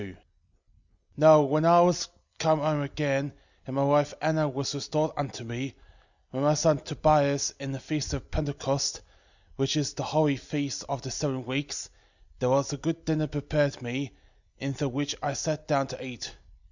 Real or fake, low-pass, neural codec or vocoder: real; 7.2 kHz; none